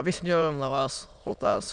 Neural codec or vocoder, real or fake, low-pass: autoencoder, 22.05 kHz, a latent of 192 numbers a frame, VITS, trained on many speakers; fake; 9.9 kHz